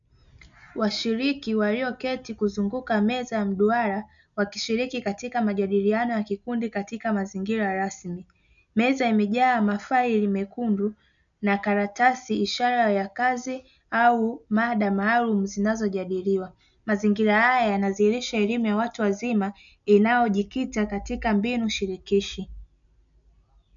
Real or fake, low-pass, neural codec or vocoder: real; 7.2 kHz; none